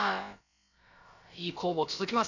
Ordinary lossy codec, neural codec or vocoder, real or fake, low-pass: none; codec, 16 kHz, about 1 kbps, DyCAST, with the encoder's durations; fake; 7.2 kHz